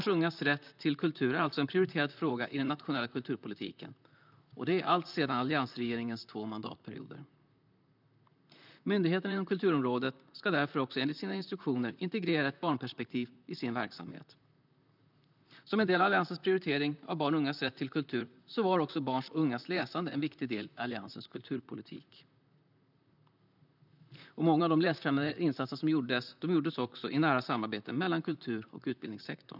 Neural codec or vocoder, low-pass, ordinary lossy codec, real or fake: vocoder, 44.1 kHz, 128 mel bands, Pupu-Vocoder; 5.4 kHz; none; fake